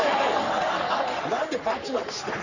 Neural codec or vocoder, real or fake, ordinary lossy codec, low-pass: codec, 44.1 kHz, 3.4 kbps, Pupu-Codec; fake; none; 7.2 kHz